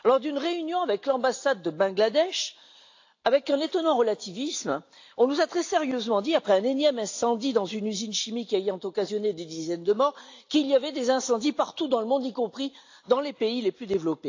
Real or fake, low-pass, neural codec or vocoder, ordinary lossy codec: real; 7.2 kHz; none; AAC, 48 kbps